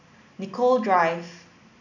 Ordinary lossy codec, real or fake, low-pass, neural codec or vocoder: none; real; 7.2 kHz; none